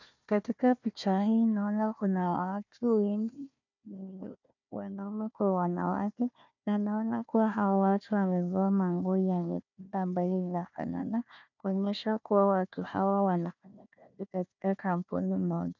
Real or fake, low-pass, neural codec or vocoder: fake; 7.2 kHz; codec, 16 kHz, 1 kbps, FunCodec, trained on Chinese and English, 50 frames a second